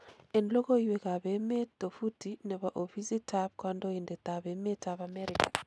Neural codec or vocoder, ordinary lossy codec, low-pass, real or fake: none; none; none; real